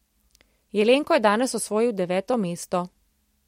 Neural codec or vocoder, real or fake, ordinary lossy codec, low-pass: none; real; MP3, 64 kbps; 19.8 kHz